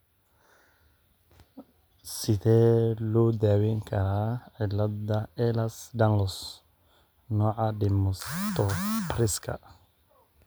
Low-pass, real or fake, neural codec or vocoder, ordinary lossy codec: none; real; none; none